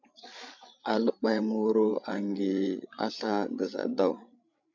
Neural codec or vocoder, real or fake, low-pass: codec, 16 kHz, 8 kbps, FreqCodec, larger model; fake; 7.2 kHz